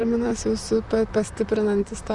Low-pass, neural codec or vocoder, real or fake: 10.8 kHz; vocoder, 44.1 kHz, 128 mel bands, Pupu-Vocoder; fake